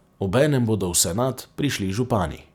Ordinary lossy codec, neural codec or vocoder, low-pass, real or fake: none; none; 19.8 kHz; real